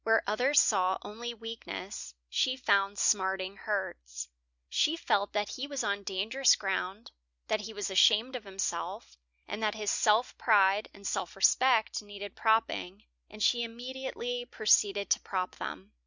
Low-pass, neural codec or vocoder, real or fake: 7.2 kHz; none; real